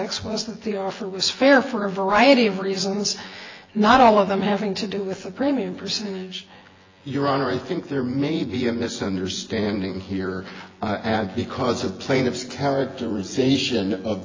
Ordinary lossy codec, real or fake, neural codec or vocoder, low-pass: AAC, 32 kbps; fake; vocoder, 24 kHz, 100 mel bands, Vocos; 7.2 kHz